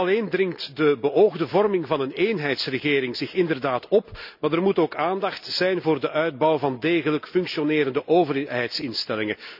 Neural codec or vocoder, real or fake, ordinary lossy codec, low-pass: none; real; none; 5.4 kHz